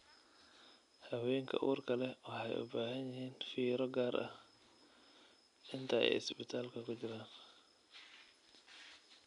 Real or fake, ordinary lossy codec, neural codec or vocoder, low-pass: real; none; none; 10.8 kHz